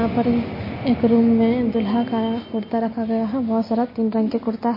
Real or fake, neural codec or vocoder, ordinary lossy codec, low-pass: real; none; MP3, 24 kbps; 5.4 kHz